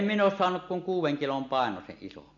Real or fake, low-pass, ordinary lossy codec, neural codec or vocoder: real; 7.2 kHz; none; none